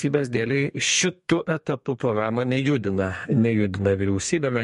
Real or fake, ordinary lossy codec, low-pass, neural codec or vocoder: fake; MP3, 48 kbps; 14.4 kHz; codec, 32 kHz, 1.9 kbps, SNAC